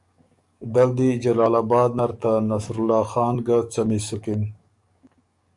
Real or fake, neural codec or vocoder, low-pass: fake; codec, 44.1 kHz, 7.8 kbps, DAC; 10.8 kHz